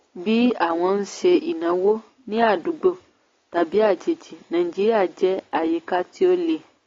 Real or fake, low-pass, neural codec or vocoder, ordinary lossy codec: real; 7.2 kHz; none; AAC, 32 kbps